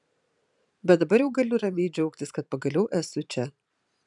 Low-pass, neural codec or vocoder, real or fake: 10.8 kHz; vocoder, 44.1 kHz, 128 mel bands every 512 samples, BigVGAN v2; fake